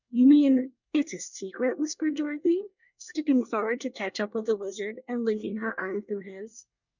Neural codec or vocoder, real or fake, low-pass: codec, 24 kHz, 1 kbps, SNAC; fake; 7.2 kHz